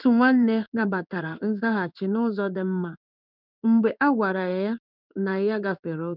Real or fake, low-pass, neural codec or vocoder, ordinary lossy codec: fake; 5.4 kHz; codec, 16 kHz in and 24 kHz out, 1 kbps, XY-Tokenizer; none